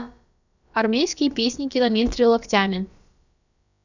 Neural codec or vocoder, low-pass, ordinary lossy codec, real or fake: codec, 16 kHz, about 1 kbps, DyCAST, with the encoder's durations; 7.2 kHz; none; fake